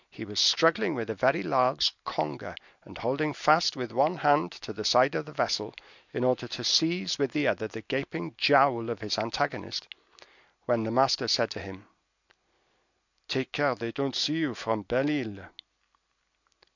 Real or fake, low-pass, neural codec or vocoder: real; 7.2 kHz; none